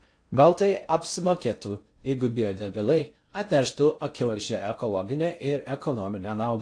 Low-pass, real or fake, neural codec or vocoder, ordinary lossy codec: 9.9 kHz; fake; codec, 16 kHz in and 24 kHz out, 0.6 kbps, FocalCodec, streaming, 4096 codes; AAC, 48 kbps